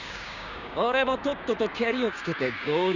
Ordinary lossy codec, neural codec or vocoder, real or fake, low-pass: none; codec, 16 kHz, 6 kbps, DAC; fake; 7.2 kHz